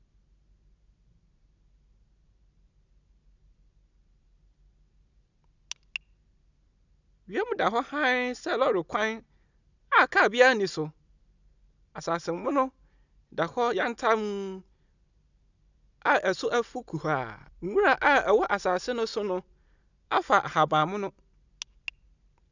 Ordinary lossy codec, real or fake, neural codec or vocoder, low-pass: none; real; none; 7.2 kHz